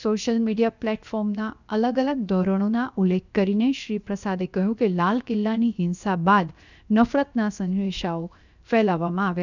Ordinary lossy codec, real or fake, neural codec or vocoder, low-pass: none; fake; codec, 16 kHz, 0.7 kbps, FocalCodec; 7.2 kHz